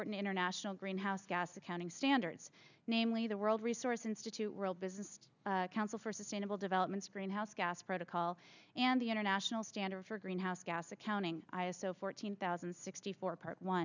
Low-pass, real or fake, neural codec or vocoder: 7.2 kHz; real; none